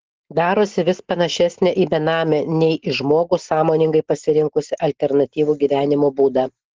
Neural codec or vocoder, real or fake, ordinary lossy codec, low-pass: none; real; Opus, 16 kbps; 7.2 kHz